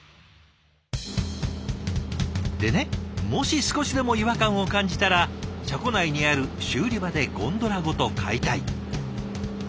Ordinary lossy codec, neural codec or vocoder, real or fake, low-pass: none; none; real; none